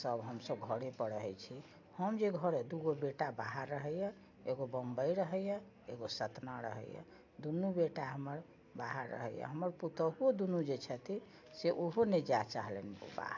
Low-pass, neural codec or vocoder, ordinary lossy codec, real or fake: 7.2 kHz; none; AAC, 48 kbps; real